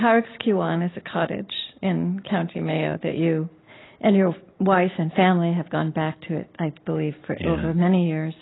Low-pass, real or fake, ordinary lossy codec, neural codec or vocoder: 7.2 kHz; real; AAC, 16 kbps; none